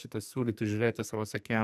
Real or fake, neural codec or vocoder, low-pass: fake; codec, 44.1 kHz, 2.6 kbps, SNAC; 14.4 kHz